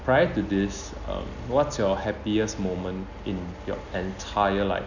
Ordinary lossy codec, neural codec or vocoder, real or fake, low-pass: none; none; real; 7.2 kHz